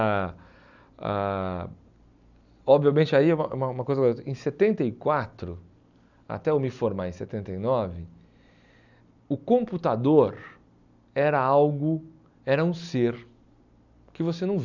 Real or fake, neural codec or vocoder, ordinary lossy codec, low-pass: real; none; none; 7.2 kHz